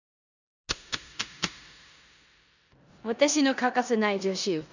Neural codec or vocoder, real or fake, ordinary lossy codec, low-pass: codec, 16 kHz in and 24 kHz out, 0.9 kbps, LongCat-Audio-Codec, four codebook decoder; fake; none; 7.2 kHz